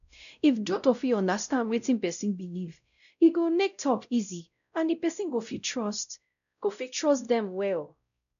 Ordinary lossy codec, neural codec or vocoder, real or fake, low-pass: none; codec, 16 kHz, 0.5 kbps, X-Codec, WavLM features, trained on Multilingual LibriSpeech; fake; 7.2 kHz